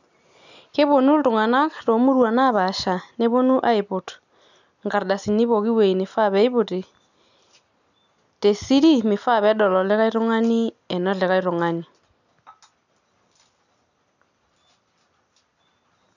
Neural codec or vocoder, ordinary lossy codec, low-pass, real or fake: none; none; 7.2 kHz; real